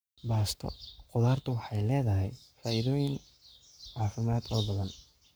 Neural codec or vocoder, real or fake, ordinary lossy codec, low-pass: codec, 44.1 kHz, 7.8 kbps, Pupu-Codec; fake; none; none